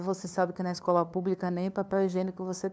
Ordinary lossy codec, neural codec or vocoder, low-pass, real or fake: none; codec, 16 kHz, 2 kbps, FunCodec, trained on LibriTTS, 25 frames a second; none; fake